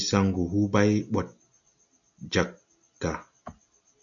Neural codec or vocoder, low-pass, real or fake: none; 7.2 kHz; real